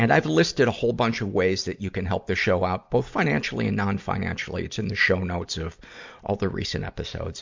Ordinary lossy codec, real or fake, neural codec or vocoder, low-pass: MP3, 64 kbps; real; none; 7.2 kHz